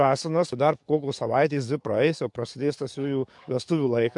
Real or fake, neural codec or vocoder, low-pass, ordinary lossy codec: fake; codec, 44.1 kHz, 7.8 kbps, DAC; 10.8 kHz; MP3, 64 kbps